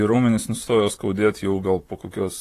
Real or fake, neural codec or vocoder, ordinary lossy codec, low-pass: fake; vocoder, 44.1 kHz, 128 mel bands, Pupu-Vocoder; AAC, 48 kbps; 14.4 kHz